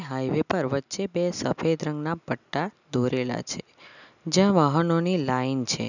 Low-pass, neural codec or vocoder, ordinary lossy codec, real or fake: 7.2 kHz; none; none; real